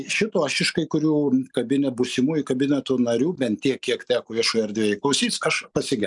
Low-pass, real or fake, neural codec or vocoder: 10.8 kHz; real; none